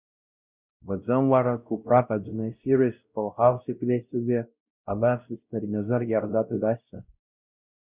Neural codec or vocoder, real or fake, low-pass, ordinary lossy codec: codec, 16 kHz, 0.5 kbps, X-Codec, WavLM features, trained on Multilingual LibriSpeech; fake; 3.6 kHz; Opus, 64 kbps